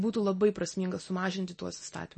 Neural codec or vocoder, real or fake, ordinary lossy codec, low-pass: none; real; MP3, 32 kbps; 10.8 kHz